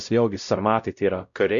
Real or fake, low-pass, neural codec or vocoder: fake; 7.2 kHz; codec, 16 kHz, 0.5 kbps, X-Codec, WavLM features, trained on Multilingual LibriSpeech